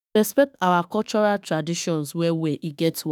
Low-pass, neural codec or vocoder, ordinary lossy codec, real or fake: none; autoencoder, 48 kHz, 32 numbers a frame, DAC-VAE, trained on Japanese speech; none; fake